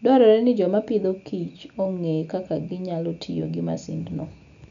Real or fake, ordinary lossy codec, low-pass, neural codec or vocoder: real; none; 7.2 kHz; none